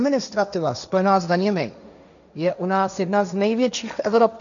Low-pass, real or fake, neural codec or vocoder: 7.2 kHz; fake; codec, 16 kHz, 1.1 kbps, Voila-Tokenizer